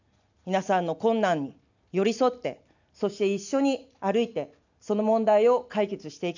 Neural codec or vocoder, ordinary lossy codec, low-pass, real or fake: none; none; 7.2 kHz; real